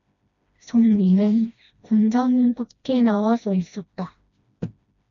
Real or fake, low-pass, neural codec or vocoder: fake; 7.2 kHz; codec, 16 kHz, 1 kbps, FreqCodec, smaller model